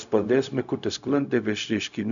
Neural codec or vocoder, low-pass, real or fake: codec, 16 kHz, 0.4 kbps, LongCat-Audio-Codec; 7.2 kHz; fake